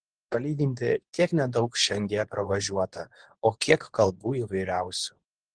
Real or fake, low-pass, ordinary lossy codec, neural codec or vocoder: fake; 9.9 kHz; Opus, 16 kbps; codec, 24 kHz, 0.9 kbps, WavTokenizer, medium speech release version 2